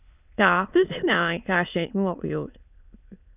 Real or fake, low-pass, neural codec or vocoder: fake; 3.6 kHz; autoencoder, 22.05 kHz, a latent of 192 numbers a frame, VITS, trained on many speakers